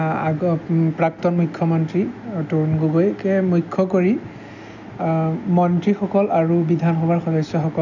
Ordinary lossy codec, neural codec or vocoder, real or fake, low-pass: none; none; real; 7.2 kHz